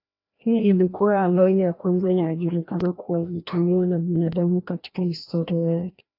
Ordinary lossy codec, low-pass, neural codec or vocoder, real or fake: AAC, 32 kbps; 5.4 kHz; codec, 16 kHz, 1 kbps, FreqCodec, larger model; fake